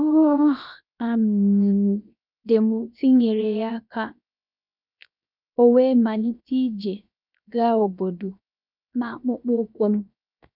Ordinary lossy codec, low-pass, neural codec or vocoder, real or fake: none; 5.4 kHz; codec, 16 kHz, 0.7 kbps, FocalCodec; fake